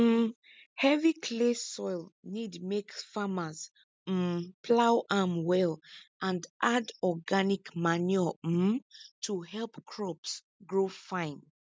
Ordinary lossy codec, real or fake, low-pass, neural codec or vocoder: none; real; none; none